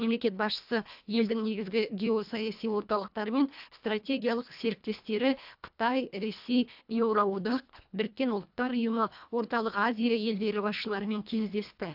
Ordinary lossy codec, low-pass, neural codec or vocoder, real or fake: AAC, 48 kbps; 5.4 kHz; codec, 24 kHz, 1.5 kbps, HILCodec; fake